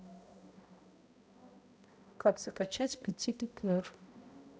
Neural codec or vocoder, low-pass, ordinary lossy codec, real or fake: codec, 16 kHz, 0.5 kbps, X-Codec, HuBERT features, trained on balanced general audio; none; none; fake